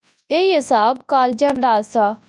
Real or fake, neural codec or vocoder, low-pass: fake; codec, 24 kHz, 0.9 kbps, WavTokenizer, large speech release; 10.8 kHz